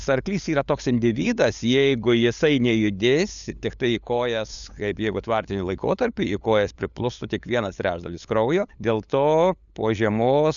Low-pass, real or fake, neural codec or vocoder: 7.2 kHz; fake; codec, 16 kHz, 8 kbps, FunCodec, trained on LibriTTS, 25 frames a second